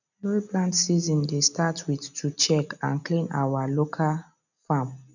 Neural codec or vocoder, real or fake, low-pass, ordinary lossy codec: none; real; 7.2 kHz; none